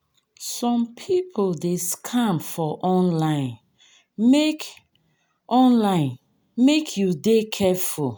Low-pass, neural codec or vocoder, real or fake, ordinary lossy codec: none; none; real; none